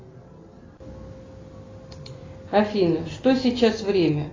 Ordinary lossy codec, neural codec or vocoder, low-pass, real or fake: AAC, 32 kbps; none; 7.2 kHz; real